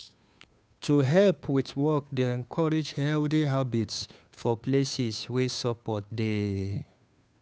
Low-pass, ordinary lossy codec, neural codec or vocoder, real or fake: none; none; codec, 16 kHz, 2 kbps, FunCodec, trained on Chinese and English, 25 frames a second; fake